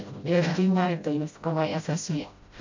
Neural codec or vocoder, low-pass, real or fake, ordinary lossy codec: codec, 16 kHz, 0.5 kbps, FreqCodec, smaller model; 7.2 kHz; fake; MP3, 64 kbps